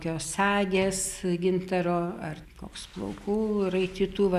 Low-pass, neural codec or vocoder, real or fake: 14.4 kHz; none; real